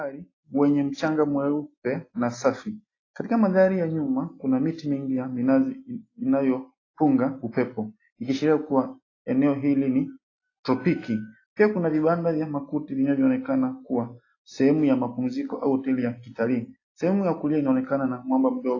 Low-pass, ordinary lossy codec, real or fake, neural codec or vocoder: 7.2 kHz; AAC, 32 kbps; real; none